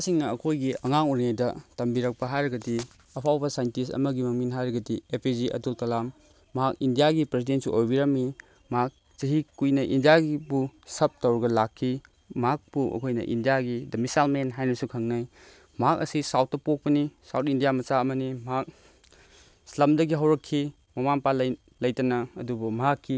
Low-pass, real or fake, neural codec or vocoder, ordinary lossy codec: none; real; none; none